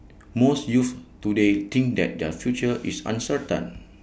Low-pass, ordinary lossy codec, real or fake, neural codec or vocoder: none; none; real; none